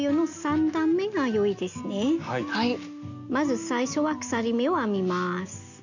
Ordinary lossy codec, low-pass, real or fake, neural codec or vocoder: none; 7.2 kHz; real; none